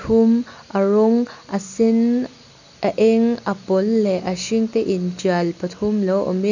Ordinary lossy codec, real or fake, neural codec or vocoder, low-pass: none; real; none; 7.2 kHz